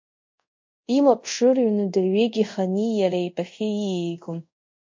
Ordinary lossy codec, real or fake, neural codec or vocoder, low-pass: MP3, 48 kbps; fake; codec, 24 kHz, 0.5 kbps, DualCodec; 7.2 kHz